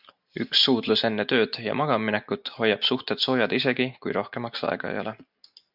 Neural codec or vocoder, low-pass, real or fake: none; 5.4 kHz; real